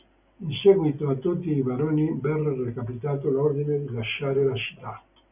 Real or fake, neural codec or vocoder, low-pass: real; none; 3.6 kHz